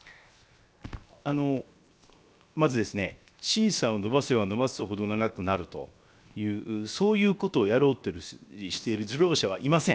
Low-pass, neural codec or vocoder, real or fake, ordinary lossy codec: none; codec, 16 kHz, 0.7 kbps, FocalCodec; fake; none